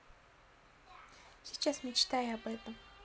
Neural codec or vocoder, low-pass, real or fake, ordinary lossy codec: none; none; real; none